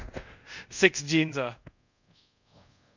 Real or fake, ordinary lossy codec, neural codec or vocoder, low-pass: fake; none; codec, 24 kHz, 0.5 kbps, DualCodec; 7.2 kHz